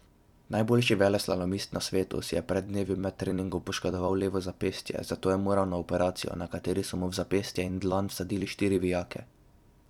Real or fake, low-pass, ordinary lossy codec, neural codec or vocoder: fake; 19.8 kHz; none; vocoder, 44.1 kHz, 128 mel bands every 256 samples, BigVGAN v2